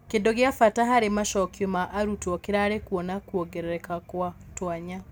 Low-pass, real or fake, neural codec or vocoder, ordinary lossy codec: none; real; none; none